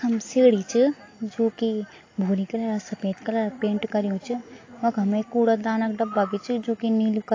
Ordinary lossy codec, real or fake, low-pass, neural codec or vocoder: AAC, 32 kbps; real; 7.2 kHz; none